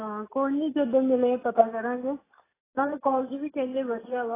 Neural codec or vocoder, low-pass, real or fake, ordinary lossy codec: none; 3.6 kHz; real; AAC, 16 kbps